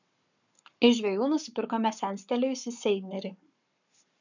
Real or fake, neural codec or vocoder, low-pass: fake; codec, 44.1 kHz, 7.8 kbps, Pupu-Codec; 7.2 kHz